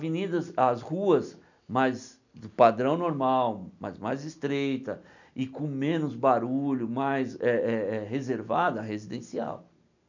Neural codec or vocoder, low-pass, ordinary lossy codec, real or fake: none; 7.2 kHz; none; real